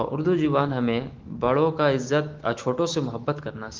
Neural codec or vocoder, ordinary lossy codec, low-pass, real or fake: autoencoder, 48 kHz, 128 numbers a frame, DAC-VAE, trained on Japanese speech; Opus, 16 kbps; 7.2 kHz; fake